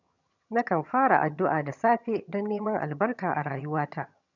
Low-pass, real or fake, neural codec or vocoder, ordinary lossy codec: 7.2 kHz; fake; vocoder, 22.05 kHz, 80 mel bands, HiFi-GAN; none